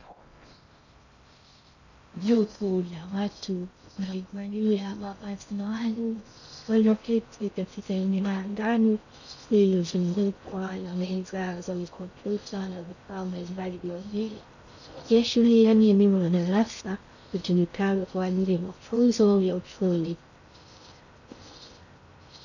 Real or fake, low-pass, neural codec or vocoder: fake; 7.2 kHz; codec, 16 kHz in and 24 kHz out, 0.6 kbps, FocalCodec, streaming, 4096 codes